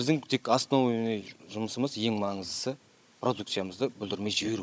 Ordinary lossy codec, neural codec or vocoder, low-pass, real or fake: none; codec, 16 kHz, 16 kbps, FunCodec, trained on Chinese and English, 50 frames a second; none; fake